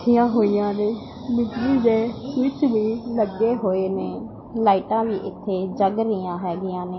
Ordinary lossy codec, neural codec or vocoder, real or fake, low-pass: MP3, 24 kbps; none; real; 7.2 kHz